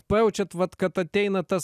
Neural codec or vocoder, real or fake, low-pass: none; real; 14.4 kHz